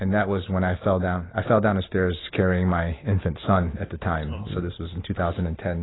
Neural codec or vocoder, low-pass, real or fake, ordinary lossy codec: none; 7.2 kHz; real; AAC, 16 kbps